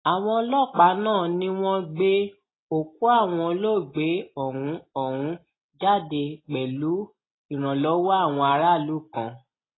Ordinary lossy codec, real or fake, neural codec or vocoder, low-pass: AAC, 16 kbps; real; none; 7.2 kHz